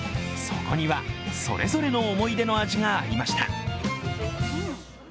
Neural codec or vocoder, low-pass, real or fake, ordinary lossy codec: none; none; real; none